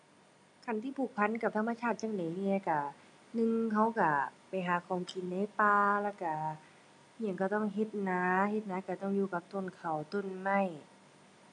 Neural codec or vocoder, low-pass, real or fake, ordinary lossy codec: none; 10.8 kHz; real; none